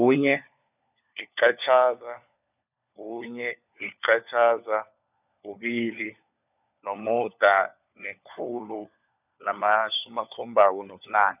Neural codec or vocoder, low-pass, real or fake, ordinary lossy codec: codec, 16 kHz, 4 kbps, FunCodec, trained on LibriTTS, 50 frames a second; 3.6 kHz; fake; none